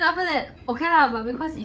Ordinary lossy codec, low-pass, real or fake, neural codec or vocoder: none; none; fake; codec, 16 kHz, 8 kbps, FreqCodec, larger model